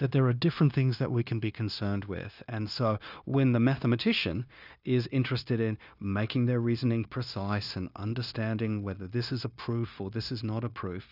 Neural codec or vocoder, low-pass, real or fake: codec, 16 kHz, 0.9 kbps, LongCat-Audio-Codec; 5.4 kHz; fake